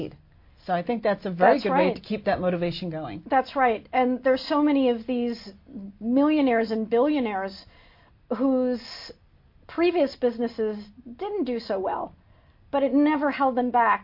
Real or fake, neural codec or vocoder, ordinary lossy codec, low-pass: real; none; MP3, 32 kbps; 5.4 kHz